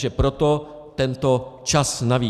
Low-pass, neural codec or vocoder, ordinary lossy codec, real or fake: 14.4 kHz; none; Opus, 64 kbps; real